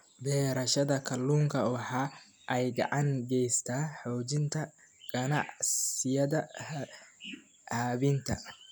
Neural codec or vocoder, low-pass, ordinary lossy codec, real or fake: none; none; none; real